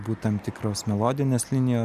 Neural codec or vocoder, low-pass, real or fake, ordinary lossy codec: none; 14.4 kHz; real; AAC, 96 kbps